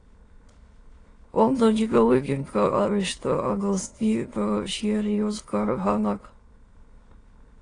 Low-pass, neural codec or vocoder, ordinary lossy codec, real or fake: 9.9 kHz; autoencoder, 22.05 kHz, a latent of 192 numbers a frame, VITS, trained on many speakers; AAC, 32 kbps; fake